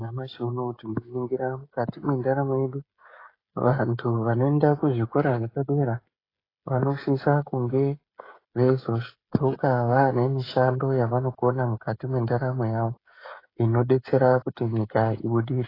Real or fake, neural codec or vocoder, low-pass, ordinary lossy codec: fake; codec, 16 kHz, 16 kbps, FreqCodec, smaller model; 5.4 kHz; AAC, 24 kbps